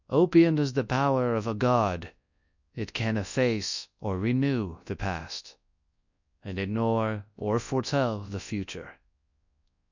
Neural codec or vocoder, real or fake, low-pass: codec, 24 kHz, 0.9 kbps, WavTokenizer, large speech release; fake; 7.2 kHz